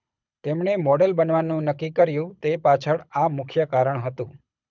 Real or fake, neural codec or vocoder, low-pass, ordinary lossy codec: fake; codec, 24 kHz, 6 kbps, HILCodec; 7.2 kHz; none